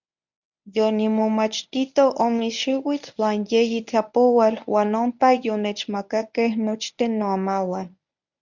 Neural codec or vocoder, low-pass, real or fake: codec, 24 kHz, 0.9 kbps, WavTokenizer, medium speech release version 1; 7.2 kHz; fake